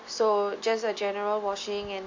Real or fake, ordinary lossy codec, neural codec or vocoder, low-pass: real; AAC, 48 kbps; none; 7.2 kHz